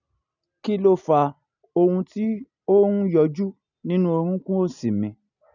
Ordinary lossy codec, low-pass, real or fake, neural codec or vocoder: none; 7.2 kHz; real; none